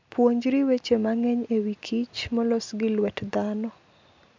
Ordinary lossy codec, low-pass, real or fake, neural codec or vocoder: MP3, 64 kbps; 7.2 kHz; real; none